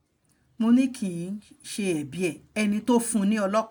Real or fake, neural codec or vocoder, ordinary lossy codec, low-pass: real; none; none; none